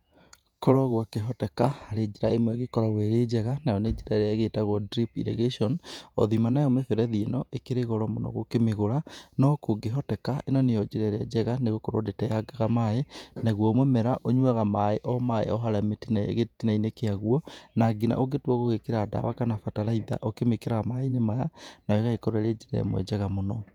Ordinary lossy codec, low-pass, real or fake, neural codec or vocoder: none; 19.8 kHz; fake; vocoder, 48 kHz, 128 mel bands, Vocos